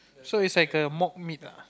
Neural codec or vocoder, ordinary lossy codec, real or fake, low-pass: none; none; real; none